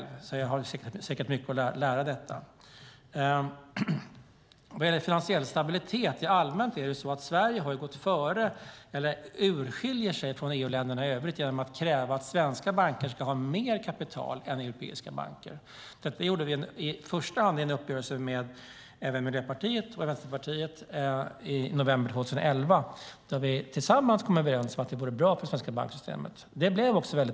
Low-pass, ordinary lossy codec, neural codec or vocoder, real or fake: none; none; none; real